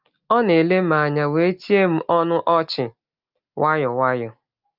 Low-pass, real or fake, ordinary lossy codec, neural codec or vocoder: 5.4 kHz; real; Opus, 24 kbps; none